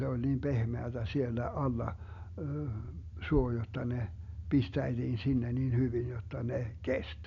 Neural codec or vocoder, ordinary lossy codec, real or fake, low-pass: none; none; real; 7.2 kHz